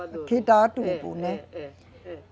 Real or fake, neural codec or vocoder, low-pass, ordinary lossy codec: real; none; none; none